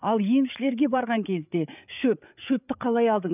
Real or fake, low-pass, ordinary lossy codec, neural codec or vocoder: fake; 3.6 kHz; none; codec, 16 kHz, 8 kbps, FreqCodec, larger model